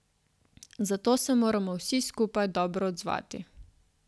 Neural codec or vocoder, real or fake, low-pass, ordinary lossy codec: none; real; none; none